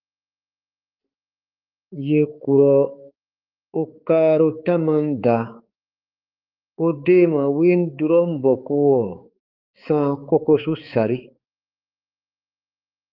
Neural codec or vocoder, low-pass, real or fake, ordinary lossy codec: codec, 16 kHz, 4 kbps, X-Codec, HuBERT features, trained on balanced general audio; 5.4 kHz; fake; Opus, 32 kbps